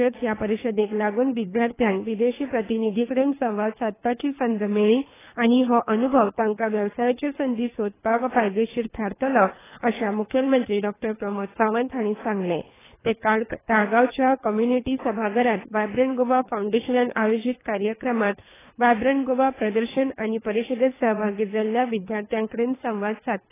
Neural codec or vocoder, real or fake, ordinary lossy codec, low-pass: codec, 24 kHz, 3 kbps, HILCodec; fake; AAC, 16 kbps; 3.6 kHz